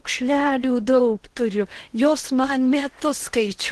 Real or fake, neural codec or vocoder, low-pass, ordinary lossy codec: fake; codec, 16 kHz in and 24 kHz out, 0.8 kbps, FocalCodec, streaming, 65536 codes; 10.8 kHz; Opus, 16 kbps